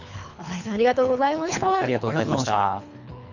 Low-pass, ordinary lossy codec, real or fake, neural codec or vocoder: 7.2 kHz; none; fake; codec, 24 kHz, 3 kbps, HILCodec